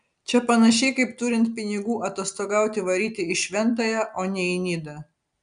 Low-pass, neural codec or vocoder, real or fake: 9.9 kHz; none; real